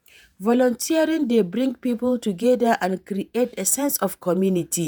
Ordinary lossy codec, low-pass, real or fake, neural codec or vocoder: none; none; fake; vocoder, 48 kHz, 128 mel bands, Vocos